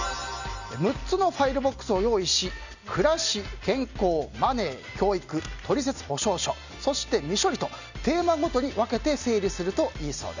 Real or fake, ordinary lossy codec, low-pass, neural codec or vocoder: real; none; 7.2 kHz; none